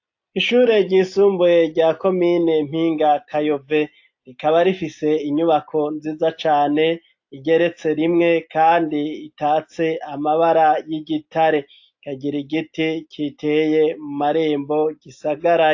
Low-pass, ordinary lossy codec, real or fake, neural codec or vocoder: 7.2 kHz; AAC, 48 kbps; real; none